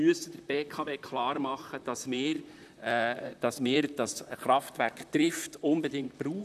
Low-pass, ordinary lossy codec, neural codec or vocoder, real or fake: 14.4 kHz; none; vocoder, 44.1 kHz, 128 mel bands, Pupu-Vocoder; fake